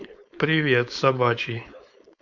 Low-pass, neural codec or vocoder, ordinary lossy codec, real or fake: 7.2 kHz; codec, 16 kHz, 4.8 kbps, FACodec; none; fake